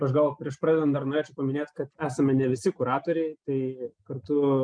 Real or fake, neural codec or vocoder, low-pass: real; none; 9.9 kHz